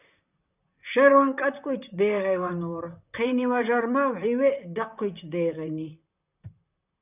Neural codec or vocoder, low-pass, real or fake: vocoder, 44.1 kHz, 128 mel bands, Pupu-Vocoder; 3.6 kHz; fake